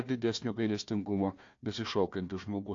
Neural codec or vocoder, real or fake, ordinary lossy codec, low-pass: codec, 16 kHz, 1 kbps, FunCodec, trained on Chinese and English, 50 frames a second; fake; AAC, 48 kbps; 7.2 kHz